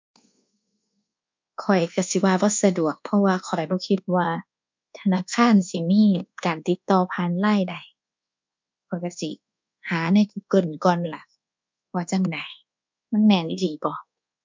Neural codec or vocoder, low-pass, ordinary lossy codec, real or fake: codec, 24 kHz, 1.2 kbps, DualCodec; 7.2 kHz; MP3, 64 kbps; fake